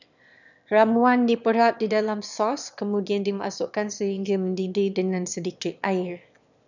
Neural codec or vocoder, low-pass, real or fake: autoencoder, 22.05 kHz, a latent of 192 numbers a frame, VITS, trained on one speaker; 7.2 kHz; fake